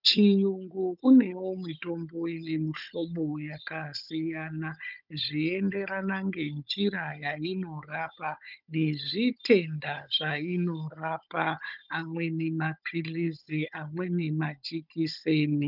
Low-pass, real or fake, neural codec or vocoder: 5.4 kHz; fake; codec, 16 kHz, 16 kbps, FunCodec, trained on Chinese and English, 50 frames a second